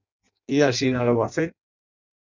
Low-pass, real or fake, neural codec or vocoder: 7.2 kHz; fake; codec, 16 kHz in and 24 kHz out, 0.6 kbps, FireRedTTS-2 codec